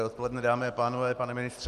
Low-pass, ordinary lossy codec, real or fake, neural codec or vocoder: 14.4 kHz; Opus, 32 kbps; real; none